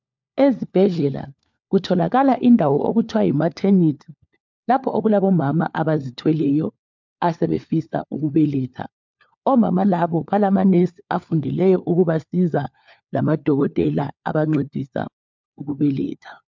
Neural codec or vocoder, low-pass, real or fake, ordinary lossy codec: codec, 16 kHz, 4 kbps, FunCodec, trained on LibriTTS, 50 frames a second; 7.2 kHz; fake; MP3, 64 kbps